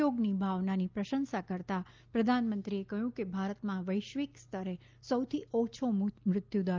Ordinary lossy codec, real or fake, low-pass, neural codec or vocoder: Opus, 24 kbps; real; 7.2 kHz; none